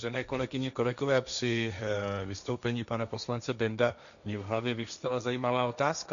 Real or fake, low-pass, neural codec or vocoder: fake; 7.2 kHz; codec, 16 kHz, 1.1 kbps, Voila-Tokenizer